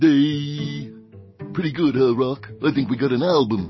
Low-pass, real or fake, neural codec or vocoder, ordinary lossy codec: 7.2 kHz; real; none; MP3, 24 kbps